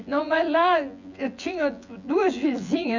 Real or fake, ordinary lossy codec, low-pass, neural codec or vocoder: fake; none; 7.2 kHz; vocoder, 24 kHz, 100 mel bands, Vocos